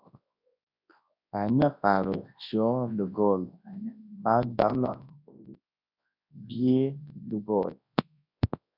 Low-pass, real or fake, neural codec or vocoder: 5.4 kHz; fake; codec, 24 kHz, 0.9 kbps, WavTokenizer, large speech release